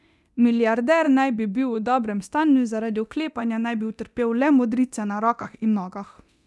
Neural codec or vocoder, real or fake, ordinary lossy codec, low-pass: codec, 24 kHz, 0.9 kbps, DualCodec; fake; none; none